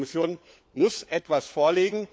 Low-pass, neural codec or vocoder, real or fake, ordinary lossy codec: none; codec, 16 kHz, 4 kbps, FunCodec, trained on LibriTTS, 50 frames a second; fake; none